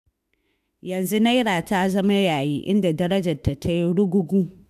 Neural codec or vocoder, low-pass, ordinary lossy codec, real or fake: autoencoder, 48 kHz, 32 numbers a frame, DAC-VAE, trained on Japanese speech; 14.4 kHz; none; fake